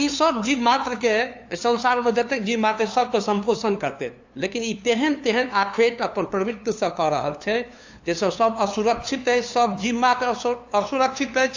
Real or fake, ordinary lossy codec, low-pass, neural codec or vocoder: fake; AAC, 48 kbps; 7.2 kHz; codec, 16 kHz, 2 kbps, FunCodec, trained on LibriTTS, 25 frames a second